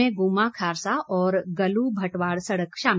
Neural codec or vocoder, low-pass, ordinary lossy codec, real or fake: none; none; none; real